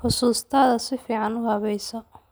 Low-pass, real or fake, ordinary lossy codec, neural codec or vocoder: none; real; none; none